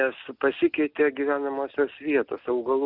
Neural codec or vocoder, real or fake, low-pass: none; real; 5.4 kHz